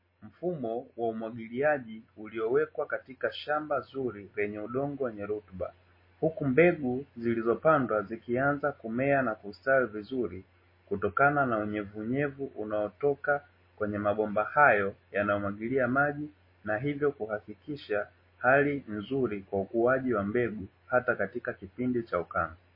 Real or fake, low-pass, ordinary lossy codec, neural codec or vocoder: real; 5.4 kHz; MP3, 24 kbps; none